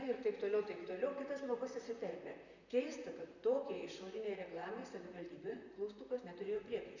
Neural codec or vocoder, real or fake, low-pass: vocoder, 44.1 kHz, 128 mel bands, Pupu-Vocoder; fake; 7.2 kHz